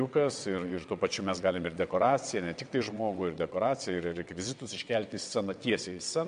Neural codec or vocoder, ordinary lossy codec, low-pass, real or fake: vocoder, 22.05 kHz, 80 mel bands, WaveNeXt; MP3, 64 kbps; 9.9 kHz; fake